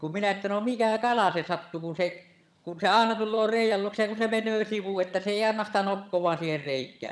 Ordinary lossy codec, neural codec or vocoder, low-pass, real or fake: none; vocoder, 22.05 kHz, 80 mel bands, HiFi-GAN; none; fake